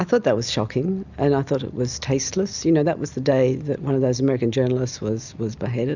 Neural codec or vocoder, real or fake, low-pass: none; real; 7.2 kHz